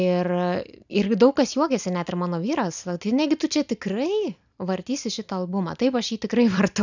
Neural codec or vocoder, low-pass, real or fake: none; 7.2 kHz; real